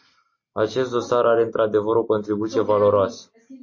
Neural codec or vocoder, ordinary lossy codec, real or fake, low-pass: none; MP3, 32 kbps; real; 7.2 kHz